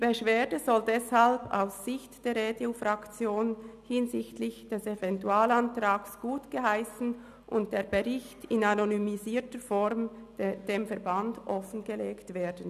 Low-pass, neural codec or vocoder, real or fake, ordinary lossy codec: 14.4 kHz; none; real; none